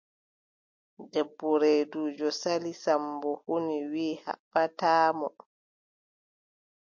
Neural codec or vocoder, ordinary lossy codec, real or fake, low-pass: none; MP3, 48 kbps; real; 7.2 kHz